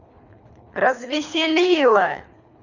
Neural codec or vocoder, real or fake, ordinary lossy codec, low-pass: codec, 24 kHz, 3 kbps, HILCodec; fake; none; 7.2 kHz